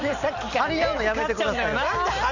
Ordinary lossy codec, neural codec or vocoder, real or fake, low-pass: none; none; real; 7.2 kHz